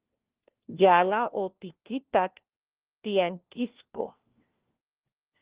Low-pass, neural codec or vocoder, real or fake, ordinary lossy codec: 3.6 kHz; codec, 16 kHz, 1 kbps, FunCodec, trained on LibriTTS, 50 frames a second; fake; Opus, 16 kbps